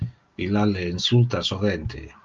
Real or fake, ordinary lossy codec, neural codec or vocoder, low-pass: real; Opus, 24 kbps; none; 7.2 kHz